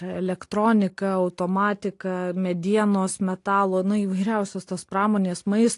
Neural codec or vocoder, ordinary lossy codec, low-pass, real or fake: none; AAC, 48 kbps; 10.8 kHz; real